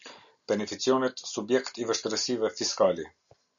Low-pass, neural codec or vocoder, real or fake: 7.2 kHz; none; real